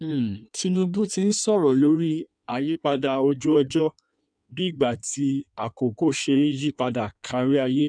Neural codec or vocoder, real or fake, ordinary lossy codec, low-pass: codec, 16 kHz in and 24 kHz out, 1.1 kbps, FireRedTTS-2 codec; fake; none; 9.9 kHz